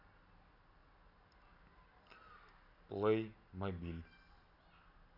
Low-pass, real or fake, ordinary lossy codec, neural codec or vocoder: 5.4 kHz; real; none; none